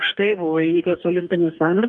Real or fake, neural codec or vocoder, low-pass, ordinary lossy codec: fake; codec, 44.1 kHz, 2.6 kbps, DAC; 10.8 kHz; Opus, 32 kbps